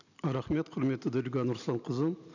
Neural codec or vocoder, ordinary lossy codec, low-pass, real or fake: none; none; 7.2 kHz; real